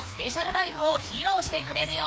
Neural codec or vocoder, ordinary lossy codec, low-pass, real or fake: codec, 16 kHz, 2 kbps, FreqCodec, larger model; none; none; fake